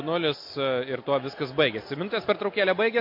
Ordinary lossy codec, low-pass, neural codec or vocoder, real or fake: MP3, 32 kbps; 5.4 kHz; none; real